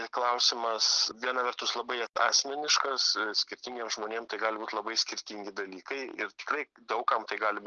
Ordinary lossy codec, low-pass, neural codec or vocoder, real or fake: Opus, 24 kbps; 7.2 kHz; none; real